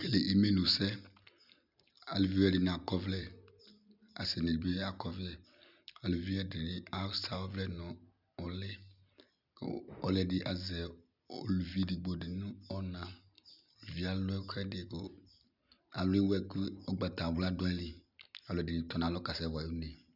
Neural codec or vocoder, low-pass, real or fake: none; 5.4 kHz; real